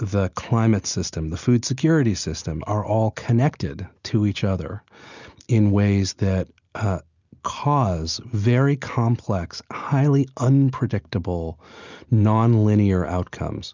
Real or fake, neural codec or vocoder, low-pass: real; none; 7.2 kHz